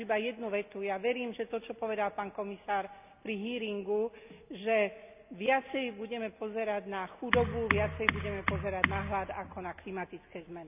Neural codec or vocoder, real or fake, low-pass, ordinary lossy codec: none; real; 3.6 kHz; none